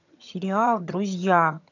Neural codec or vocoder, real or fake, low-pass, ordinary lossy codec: vocoder, 22.05 kHz, 80 mel bands, HiFi-GAN; fake; 7.2 kHz; none